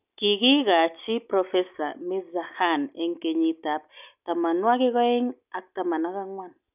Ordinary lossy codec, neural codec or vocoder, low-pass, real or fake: none; none; 3.6 kHz; real